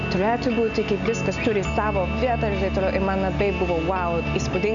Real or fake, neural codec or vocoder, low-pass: real; none; 7.2 kHz